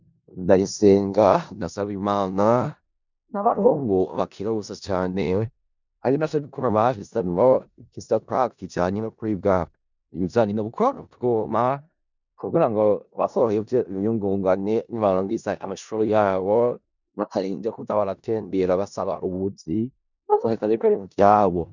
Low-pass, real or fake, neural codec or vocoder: 7.2 kHz; fake; codec, 16 kHz in and 24 kHz out, 0.4 kbps, LongCat-Audio-Codec, four codebook decoder